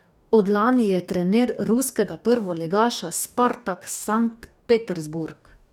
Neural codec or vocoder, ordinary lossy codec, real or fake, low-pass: codec, 44.1 kHz, 2.6 kbps, DAC; none; fake; 19.8 kHz